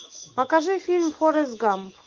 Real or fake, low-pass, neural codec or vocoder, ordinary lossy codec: fake; 7.2 kHz; autoencoder, 48 kHz, 128 numbers a frame, DAC-VAE, trained on Japanese speech; Opus, 24 kbps